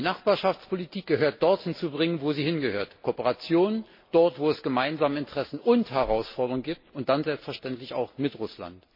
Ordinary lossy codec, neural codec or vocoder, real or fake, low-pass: MP3, 24 kbps; none; real; 5.4 kHz